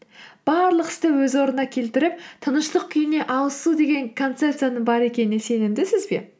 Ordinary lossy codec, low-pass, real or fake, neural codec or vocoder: none; none; real; none